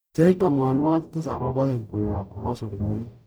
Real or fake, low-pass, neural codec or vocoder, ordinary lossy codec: fake; none; codec, 44.1 kHz, 0.9 kbps, DAC; none